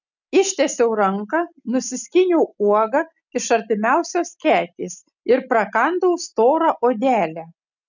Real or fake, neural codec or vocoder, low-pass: real; none; 7.2 kHz